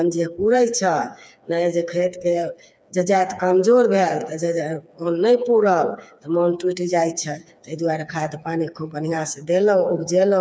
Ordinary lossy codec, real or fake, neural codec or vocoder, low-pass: none; fake; codec, 16 kHz, 4 kbps, FreqCodec, smaller model; none